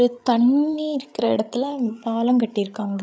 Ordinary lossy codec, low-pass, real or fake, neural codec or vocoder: none; none; fake; codec, 16 kHz, 16 kbps, FreqCodec, larger model